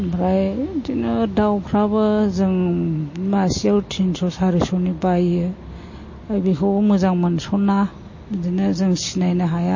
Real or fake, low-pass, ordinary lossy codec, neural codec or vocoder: real; 7.2 kHz; MP3, 32 kbps; none